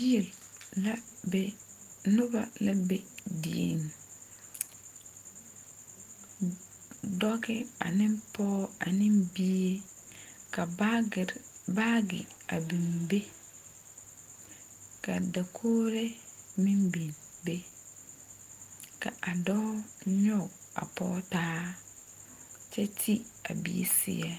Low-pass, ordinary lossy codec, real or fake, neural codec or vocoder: 14.4 kHz; Opus, 24 kbps; real; none